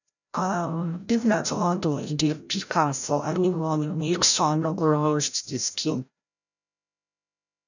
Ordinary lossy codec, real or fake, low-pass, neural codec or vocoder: none; fake; 7.2 kHz; codec, 16 kHz, 0.5 kbps, FreqCodec, larger model